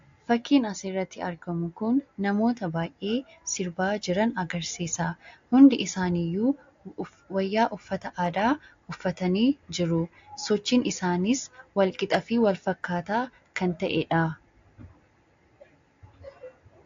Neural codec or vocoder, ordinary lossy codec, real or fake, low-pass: none; AAC, 48 kbps; real; 7.2 kHz